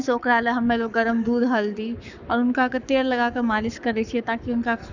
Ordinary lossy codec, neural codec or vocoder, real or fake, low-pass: none; codec, 44.1 kHz, 7.8 kbps, Pupu-Codec; fake; 7.2 kHz